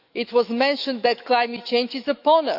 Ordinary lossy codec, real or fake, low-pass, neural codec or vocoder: none; fake; 5.4 kHz; autoencoder, 48 kHz, 128 numbers a frame, DAC-VAE, trained on Japanese speech